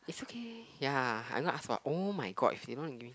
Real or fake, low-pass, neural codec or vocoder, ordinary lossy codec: real; none; none; none